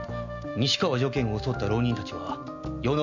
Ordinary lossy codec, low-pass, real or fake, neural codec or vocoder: none; 7.2 kHz; real; none